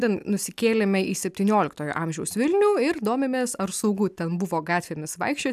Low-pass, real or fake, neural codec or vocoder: 14.4 kHz; real; none